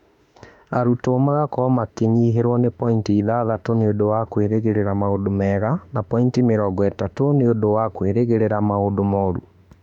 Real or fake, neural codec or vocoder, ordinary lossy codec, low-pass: fake; autoencoder, 48 kHz, 32 numbers a frame, DAC-VAE, trained on Japanese speech; none; 19.8 kHz